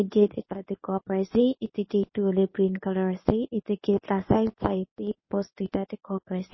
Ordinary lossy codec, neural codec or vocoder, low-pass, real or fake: MP3, 24 kbps; codec, 24 kHz, 0.9 kbps, WavTokenizer, medium speech release version 1; 7.2 kHz; fake